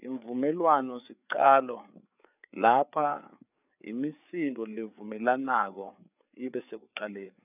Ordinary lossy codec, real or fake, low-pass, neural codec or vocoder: none; fake; 3.6 kHz; codec, 16 kHz, 4 kbps, FreqCodec, larger model